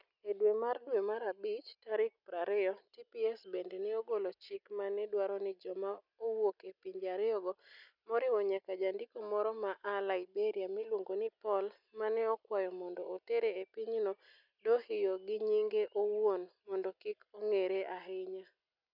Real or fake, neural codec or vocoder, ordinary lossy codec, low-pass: real; none; none; 5.4 kHz